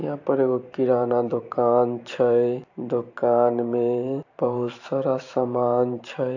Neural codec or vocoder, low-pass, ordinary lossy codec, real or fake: vocoder, 44.1 kHz, 128 mel bands every 512 samples, BigVGAN v2; 7.2 kHz; AAC, 48 kbps; fake